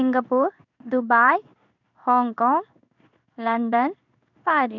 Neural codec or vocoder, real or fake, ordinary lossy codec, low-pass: codec, 16 kHz in and 24 kHz out, 1 kbps, XY-Tokenizer; fake; none; 7.2 kHz